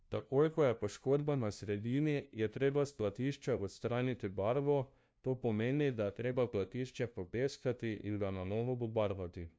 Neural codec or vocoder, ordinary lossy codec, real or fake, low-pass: codec, 16 kHz, 0.5 kbps, FunCodec, trained on LibriTTS, 25 frames a second; none; fake; none